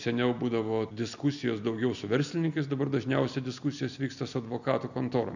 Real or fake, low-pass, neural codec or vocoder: real; 7.2 kHz; none